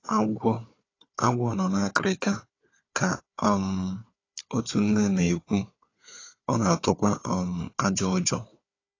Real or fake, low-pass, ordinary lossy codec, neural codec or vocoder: fake; 7.2 kHz; AAC, 32 kbps; codec, 16 kHz, 4 kbps, FunCodec, trained on Chinese and English, 50 frames a second